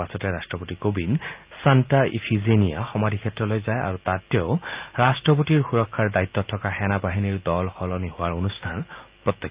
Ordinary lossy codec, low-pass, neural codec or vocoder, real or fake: Opus, 24 kbps; 3.6 kHz; none; real